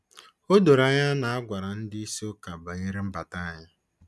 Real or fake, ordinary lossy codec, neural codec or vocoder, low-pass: real; none; none; none